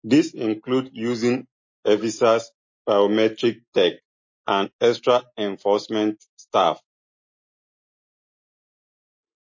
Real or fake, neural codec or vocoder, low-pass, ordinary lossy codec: real; none; 7.2 kHz; MP3, 32 kbps